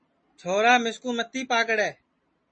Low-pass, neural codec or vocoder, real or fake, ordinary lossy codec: 9.9 kHz; none; real; MP3, 32 kbps